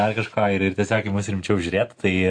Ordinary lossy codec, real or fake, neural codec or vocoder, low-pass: MP3, 48 kbps; real; none; 9.9 kHz